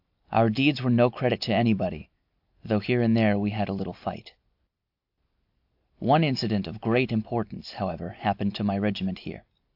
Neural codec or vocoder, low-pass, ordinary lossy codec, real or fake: none; 5.4 kHz; AAC, 48 kbps; real